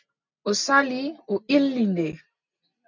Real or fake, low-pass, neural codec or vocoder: real; 7.2 kHz; none